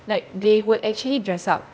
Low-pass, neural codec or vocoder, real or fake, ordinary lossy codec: none; codec, 16 kHz, 0.8 kbps, ZipCodec; fake; none